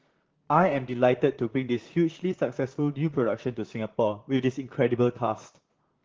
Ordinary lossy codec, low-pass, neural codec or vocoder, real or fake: Opus, 16 kbps; 7.2 kHz; vocoder, 44.1 kHz, 128 mel bands, Pupu-Vocoder; fake